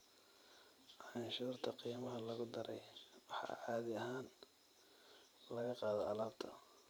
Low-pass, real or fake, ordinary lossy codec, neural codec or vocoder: none; fake; none; vocoder, 44.1 kHz, 128 mel bands every 512 samples, BigVGAN v2